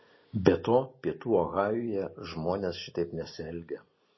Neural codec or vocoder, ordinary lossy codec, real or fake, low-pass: none; MP3, 24 kbps; real; 7.2 kHz